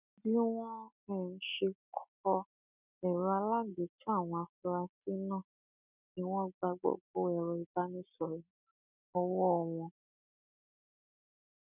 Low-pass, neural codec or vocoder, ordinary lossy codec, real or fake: 3.6 kHz; none; none; real